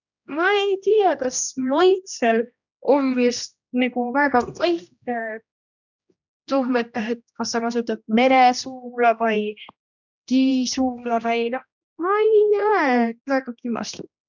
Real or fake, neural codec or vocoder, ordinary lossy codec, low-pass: fake; codec, 16 kHz, 1 kbps, X-Codec, HuBERT features, trained on general audio; none; 7.2 kHz